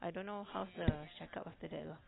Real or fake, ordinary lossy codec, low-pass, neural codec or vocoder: real; AAC, 16 kbps; 7.2 kHz; none